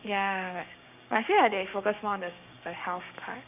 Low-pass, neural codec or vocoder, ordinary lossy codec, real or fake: 3.6 kHz; codec, 16 kHz, 6 kbps, DAC; none; fake